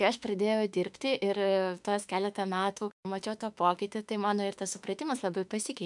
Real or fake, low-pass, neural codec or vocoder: fake; 10.8 kHz; autoencoder, 48 kHz, 32 numbers a frame, DAC-VAE, trained on Japanese speech